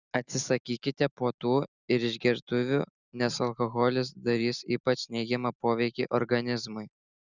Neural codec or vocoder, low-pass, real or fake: none; 7.2 kHz; real